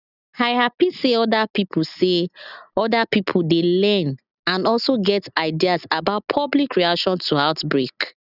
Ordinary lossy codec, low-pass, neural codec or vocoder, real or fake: none; 5.4 kHz; none; real